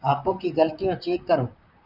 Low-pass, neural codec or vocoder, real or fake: 5.4 kHz; vocoder, 44.1 kHz, 128 mel bands, Pupu-Vocoder; fake